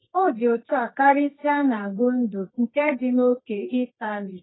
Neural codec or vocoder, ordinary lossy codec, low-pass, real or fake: codec, 24 kHz, 0.9 kbps, WavTokenizer, medium music audio release; AAC, 16 kbps; 7.2 kHz; fake